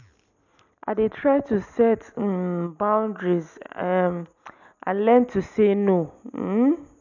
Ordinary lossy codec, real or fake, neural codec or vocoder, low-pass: none; real; none; 7.2 kHz